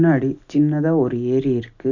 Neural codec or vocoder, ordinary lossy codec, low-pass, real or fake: none; none; 7.2 kHz; real